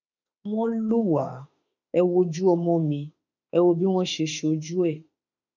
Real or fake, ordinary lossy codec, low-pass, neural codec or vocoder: fake; none; 7.2 kHz; autoencoder, 48 kHz, 32 numbers a frame, DAC-VAE, trained on Japanese speech